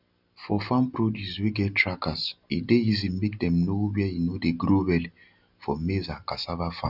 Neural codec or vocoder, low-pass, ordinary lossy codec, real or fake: none; 5.4 kHz; none; real